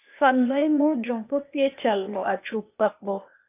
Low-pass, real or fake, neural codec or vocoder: 3.6 kHz; fake; codec, 16 kHz, 0.8 kbps, ZipCodec